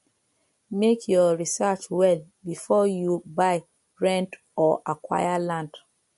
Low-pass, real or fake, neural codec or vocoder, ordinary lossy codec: 14.4 kHz; real; none; MP3, 48 kbps